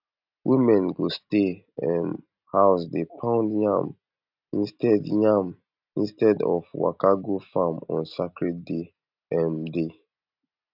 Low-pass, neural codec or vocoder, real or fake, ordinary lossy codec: 5.4 kHz; none; real; none